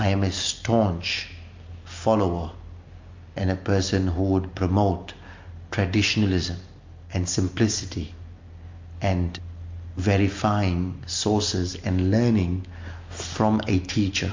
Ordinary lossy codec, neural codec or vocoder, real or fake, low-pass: MP3, 48 kbps; none; real; 7.2 kHz